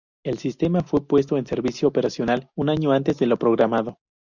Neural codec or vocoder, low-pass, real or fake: none; 7.2 kHz; real